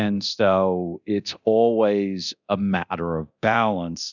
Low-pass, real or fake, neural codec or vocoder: 7.2 kHz; fake; codec, 24 kHz, 0.9 kbps, DualCodec